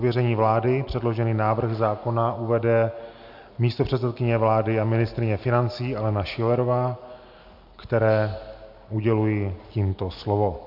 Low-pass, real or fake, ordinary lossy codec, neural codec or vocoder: 5.4 kHz; real; MP3, 48 kbps; none